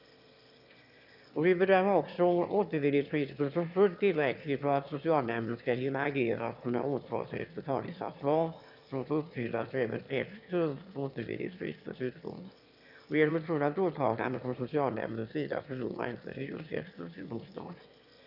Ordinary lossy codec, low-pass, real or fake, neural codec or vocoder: none; 5.4 kHz; fake; autoencoder, 22.05 kHz, a latent of 192 numbers a frame, VITS, trained on one speaker